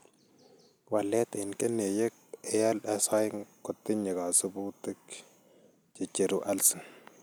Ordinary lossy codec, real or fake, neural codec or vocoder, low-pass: none; real; none; none